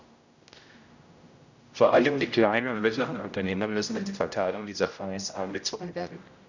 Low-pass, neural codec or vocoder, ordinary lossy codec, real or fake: 7.2 kHz; codec, 16 kHz, 0.5 kbps, X-Codec, HuBERT features, trained on general audio; none; fake